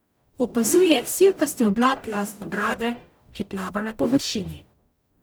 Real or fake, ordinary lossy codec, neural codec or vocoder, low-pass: fake; none; codec, 44.1 kHz, 0.9 kbps, DAC; none